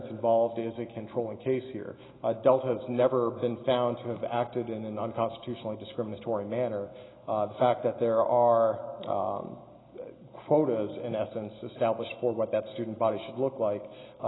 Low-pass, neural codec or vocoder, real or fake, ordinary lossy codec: 7.2 kHz; none; real; AAC, 16 kbps